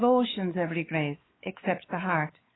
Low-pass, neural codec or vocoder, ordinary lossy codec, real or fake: 7.2 kHz; codec, 16 kHz, 4 kbps, X-Codec, WavLM features, trained on Multilingual LibriSpeech; AAC, 16 kbps; fake